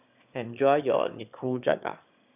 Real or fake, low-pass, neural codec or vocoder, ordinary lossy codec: fake; 3.6 kHz; autoencoder, 22.05 kHz, a latent of 192 numbers a frame, VITS, trained on one speaker; none